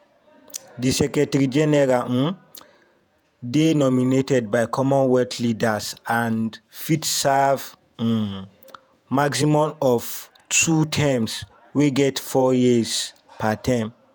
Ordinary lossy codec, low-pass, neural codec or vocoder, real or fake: none; none; vocoder, 48 kHz, 128 mel bands, Vocos; fake